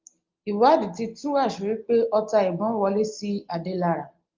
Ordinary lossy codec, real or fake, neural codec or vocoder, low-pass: Opus, 24 kbps; real; none; 7.2 kHz